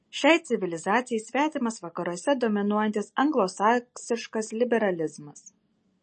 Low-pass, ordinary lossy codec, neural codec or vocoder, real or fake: 10.8 kHz; MP3, 32 kbps; none; real